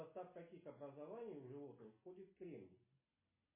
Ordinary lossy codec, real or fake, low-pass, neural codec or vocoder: MP3, 16 kbps; real; 3.6 kHz; none